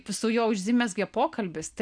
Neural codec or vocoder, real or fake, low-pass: none; real; 9.9 kHz